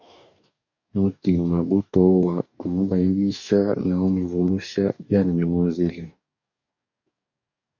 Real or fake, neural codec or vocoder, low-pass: fake; codec, 44.1 kHz, 2.6 kbps, DAC; 7.2 kHz